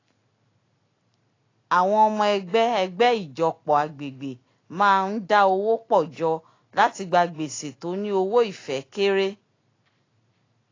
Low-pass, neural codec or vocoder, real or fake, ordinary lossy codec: 7.2 kHz; none; real; AAC, 32 kbps